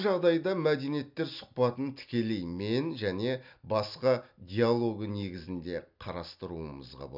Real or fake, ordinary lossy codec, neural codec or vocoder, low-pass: real; none; none; 5.4 kHz